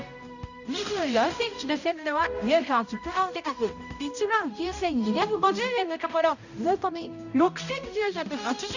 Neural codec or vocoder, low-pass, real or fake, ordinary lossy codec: codec, 16 kHz, 0.5 kbps, X-Codec, HuBERT features, trained on balanced general audio; 7.2 kHz; fake; none